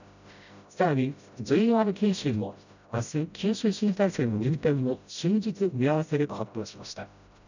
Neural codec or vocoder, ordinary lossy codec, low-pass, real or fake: codec, 16 kHz, 0.5 kbps, FreqCodec, smaller model; none; 7.2 kHz; fake